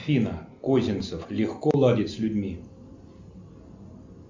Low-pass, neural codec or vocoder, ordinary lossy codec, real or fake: 7.2 kHz; none; MP3, 64 kbps; real